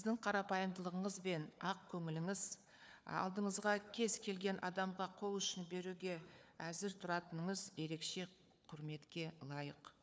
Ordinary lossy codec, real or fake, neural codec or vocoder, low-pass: none; fake; codec, 16 kHz, 4 kbps, FunCodec, trained on Chinese and English, 50 frames a second; none